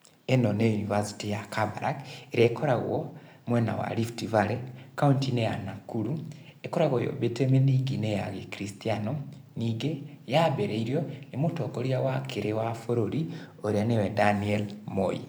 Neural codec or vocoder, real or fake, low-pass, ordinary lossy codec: vocoder, 44.1 kHz, 128 mel bands every 512 samples, BigVGAN v2; fake; none; none